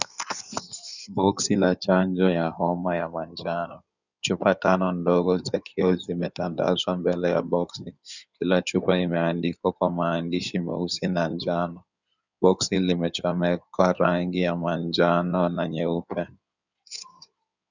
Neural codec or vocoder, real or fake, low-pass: codec, 16 kHz in and 24 kHz out, 2.2 kbps, FireRedTTS-2 codec; fake; 7.2 kHz